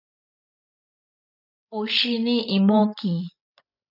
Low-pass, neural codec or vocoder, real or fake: 5.4 kHz; codec, 16 kHz, 16 kbps, FreqCodec, larger model; fake